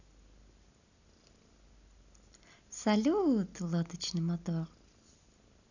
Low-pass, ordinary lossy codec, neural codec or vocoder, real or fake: 7.2 kHz; none; none; real